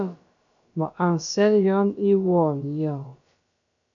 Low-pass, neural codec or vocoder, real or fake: 7.2 kHz; codec, 16 kHz, about 1 kbps, DyCAST, with the encoder's durations; fake